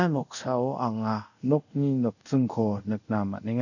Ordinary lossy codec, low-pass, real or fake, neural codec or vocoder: none; 7.2 kHz; fake; codec, 24 kHz, 0.5 kbps, DualCodec